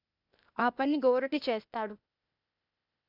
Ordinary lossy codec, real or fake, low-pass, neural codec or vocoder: none; fake; 5.4 kHz; codec, 16 kHz, 0.8 kbps, ZipCodec